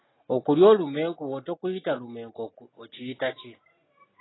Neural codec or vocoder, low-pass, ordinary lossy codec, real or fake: none; 7.2 kHz; AAC, 16 kbps; real